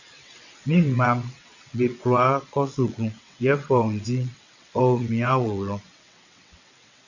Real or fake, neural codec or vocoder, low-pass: fake; vocoder, 22.05 kHz, 80 mel bands, WaveNeXt; 7.2 kHz